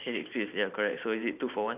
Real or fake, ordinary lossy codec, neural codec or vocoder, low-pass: fake; none; vocoder, 44.1 kHz, 128 mel bands every 512 samples, BigVGAN v2; 3.6 kHz